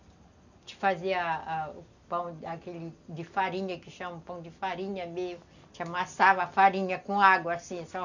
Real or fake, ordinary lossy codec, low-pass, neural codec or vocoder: real; none; 7.2 kHz; none